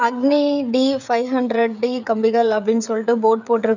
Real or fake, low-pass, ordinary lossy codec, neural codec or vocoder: fake; 7.2 kHz; none; codec, 16 kHz, 16 kbps, FreqCodec, smaller model